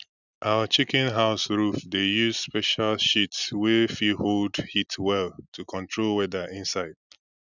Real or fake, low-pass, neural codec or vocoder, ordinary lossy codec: real; 7.2 kHz; none; none